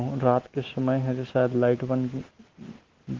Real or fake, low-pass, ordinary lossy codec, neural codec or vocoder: real; 7.2 kHz; Opus, 16 kbps; none